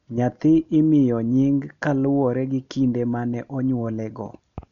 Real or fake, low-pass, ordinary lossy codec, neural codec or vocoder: real; 7.2 kHz; MP3, 96 kbps; none